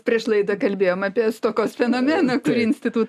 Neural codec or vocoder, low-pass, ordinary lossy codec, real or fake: none; 14.4 kHz; AAC, 96 kbps; real